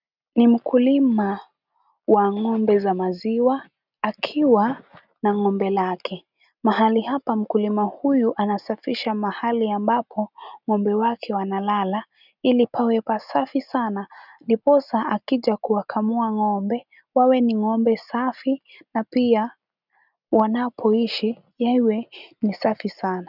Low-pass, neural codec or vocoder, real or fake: 5.4 kHz; none; real